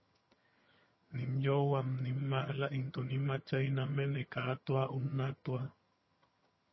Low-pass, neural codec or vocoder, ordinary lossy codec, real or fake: 7.2 kHz; vocoder, 22.05 kHz, 80 mel bands, HiFi-GAN; MP3, 24 kbps; fake